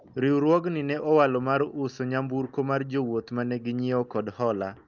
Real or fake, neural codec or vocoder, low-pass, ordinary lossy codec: real; none; 7.2 kHz; Opus, 24 kbps